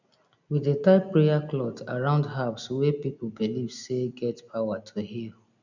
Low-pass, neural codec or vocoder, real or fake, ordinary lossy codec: 7.2 kHz; none; real; none